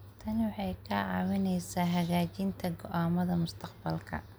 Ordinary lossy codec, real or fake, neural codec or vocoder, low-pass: none; real; none; none